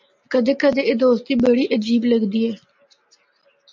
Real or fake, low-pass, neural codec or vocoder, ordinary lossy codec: real; 7.2 kHz; none; MP3, 64 kbps